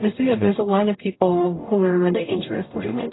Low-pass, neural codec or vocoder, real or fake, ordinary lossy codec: 7.2 kHz; codec, 44.1 kHz, 0.9 kbps, DAC; fake; AAC, 16 kbps